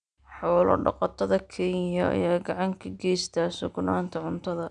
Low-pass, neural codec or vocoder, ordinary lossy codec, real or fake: 9.9 kHz; none; none; real